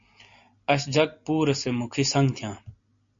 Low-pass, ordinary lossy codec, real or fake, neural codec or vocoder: 7.2 kHz; MP3, 48 kbps; real; none